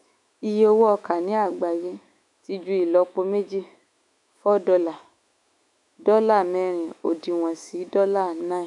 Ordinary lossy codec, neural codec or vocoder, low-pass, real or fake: none; autoencoder, 48 kHz, 128 numbers a frame, DAC-VAE, trained on Japanese speech; 10.8 kHz; fake